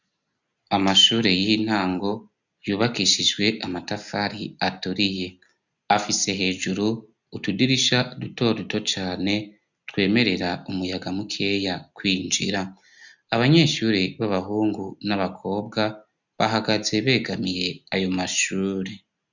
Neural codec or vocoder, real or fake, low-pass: none; real; 7.2 kHz